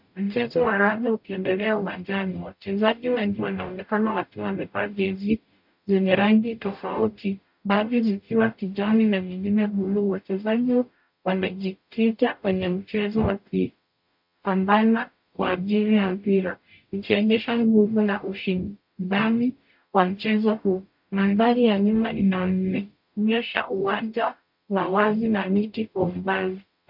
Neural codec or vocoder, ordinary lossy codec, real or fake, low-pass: codec, 44.1 kHz, 0.9 kbps, DAC; MP3, 48 kbps; fake; 5.4 kHz